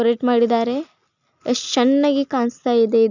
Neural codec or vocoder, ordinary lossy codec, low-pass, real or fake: none; none; 7.2 kHz; real